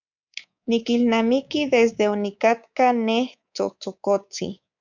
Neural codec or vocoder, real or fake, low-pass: codec, 24 kHz, 3.1 kbps, DualCodec; fake; 7.2 kHz